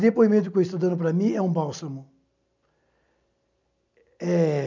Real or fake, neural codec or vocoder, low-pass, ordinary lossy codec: real; none; 7.2 kHz; none